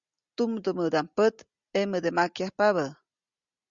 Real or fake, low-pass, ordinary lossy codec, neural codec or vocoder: real; 7.2 kHz; Opus, 64 kbps; none